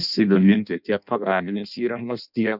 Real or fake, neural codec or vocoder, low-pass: fake; codec, 16 kHz in and 24 kHz out, 0.6 kbps, FireRedTTS-2 codec; 5.4 kHz